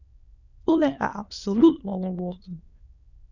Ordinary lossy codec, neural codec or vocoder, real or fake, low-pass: none; autoencoder, 22.05 kHz, a latent of 192 numbers a frame, VITS, trained on many speakers; fake; 7.2 kHz